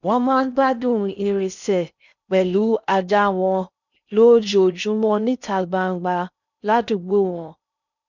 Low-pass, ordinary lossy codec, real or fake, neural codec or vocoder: 7.2 kHz; none; fake; codec, 16 kHz in and 24 kHz out, 0.6 kbps, FocalCodec, streaming, 4096 codes